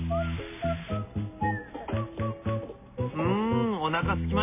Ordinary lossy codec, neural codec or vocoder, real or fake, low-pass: MP3, 24 kbps; none; real; 3.6 kHz